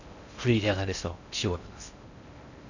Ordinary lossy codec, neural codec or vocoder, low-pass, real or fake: none; codec, 16 kHz in and 24 kHz out, 0.6 kbps, FocalCodec, streaming, 2048 codes; 7.2 kHz; fake